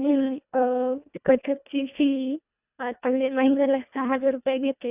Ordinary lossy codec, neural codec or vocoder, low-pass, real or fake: none; codec, 24 kHz, 1.5 kbps, HILCodec; 3.6 kHz; fake